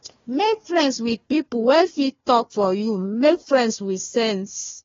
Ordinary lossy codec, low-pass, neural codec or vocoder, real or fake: AAC, 24 kbps; 7.2 kHz; codec, 16 kHz, 1 kbps, FunCodec, trained on Chinese and English, 50 frames a second; fake